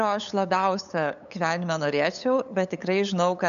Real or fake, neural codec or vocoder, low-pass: fake; codec, 16 kHz, 8 kbps, FunCodec, trained on LibriTTS, 25 frames a second; 7.2 kHz